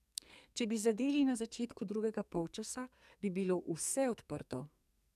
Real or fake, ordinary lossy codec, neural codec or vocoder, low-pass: fake; none; codec, 44.1 kHz, 2.6 kbps, SNAC; 14.4 kHz